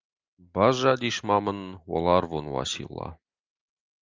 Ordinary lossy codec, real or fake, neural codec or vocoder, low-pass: Opus, 32 kbps; real; none; 7.2 kHz